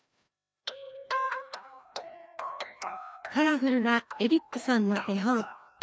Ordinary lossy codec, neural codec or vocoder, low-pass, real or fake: none; codec, 16 kHz, 1 kbps, FreqCodec, larger model; none; fake